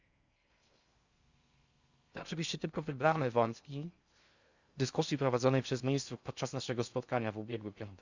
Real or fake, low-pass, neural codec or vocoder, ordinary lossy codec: fake; 7.2 kHz; codec, 16 kHz in and 24 kHz out, 0.6 kbps, FocalCodec, streaming, 4096 codes; Opus, 64 kbps